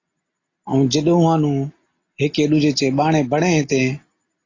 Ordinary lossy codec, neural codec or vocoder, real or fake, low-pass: AAC, 32 kbps; none; real; 7.2 kHz